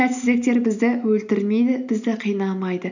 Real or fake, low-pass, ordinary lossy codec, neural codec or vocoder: real; 7.2 kHz; none; none